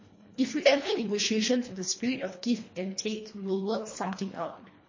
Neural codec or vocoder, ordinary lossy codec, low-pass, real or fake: codec, 24 kHz, 1.5 kbps, HILCodec; MP3, 32 kbps; 7.2 kHz; fake